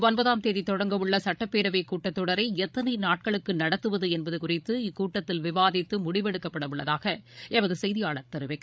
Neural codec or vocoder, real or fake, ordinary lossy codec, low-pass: codec, 16 kHz, 16 kbps, FreqCodec, larger model; fake; none; none